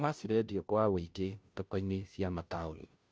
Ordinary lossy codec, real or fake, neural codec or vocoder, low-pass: none; fake; codec, 16 kHz, 0.5 kbps, FunCodec, trained on Chinese and English, 25 frames a second; none